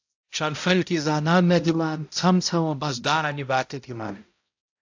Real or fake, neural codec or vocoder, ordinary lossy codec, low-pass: fake; codec, 16 kHz, 0.5 kbps, X-Codec, HuBERT features, trained on balanced general audio; AAC, 48 kbps; 7.2 kHz